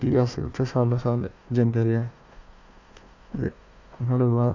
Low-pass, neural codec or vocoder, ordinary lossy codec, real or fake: 7.2 kHz; codec, 16 kHz, 1 kbps, FunCodec, trained on Chinese and English, 50 frames a second; none; fake